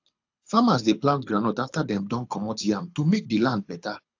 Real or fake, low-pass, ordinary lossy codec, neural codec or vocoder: fake; 7.2 kHz; AAC, 48 kbps; codec, 24 kHz, 6 kbps, HILCodec